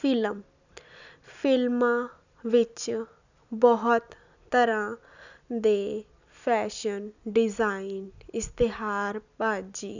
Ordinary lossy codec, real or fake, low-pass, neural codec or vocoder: none; real; 7.2 kHz; none